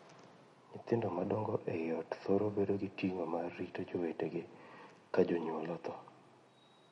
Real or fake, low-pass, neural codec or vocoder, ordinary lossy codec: real; 10.8 kHz; none; MP3, 48 kbps